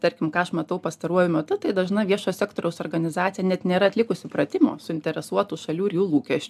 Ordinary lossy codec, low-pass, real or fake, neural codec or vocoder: AAC, 96 kbps; 14.4 kHz; real; none